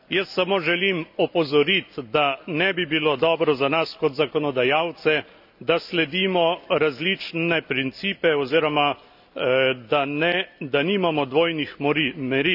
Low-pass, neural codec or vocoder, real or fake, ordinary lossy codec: 5.4 kHz; none; real; none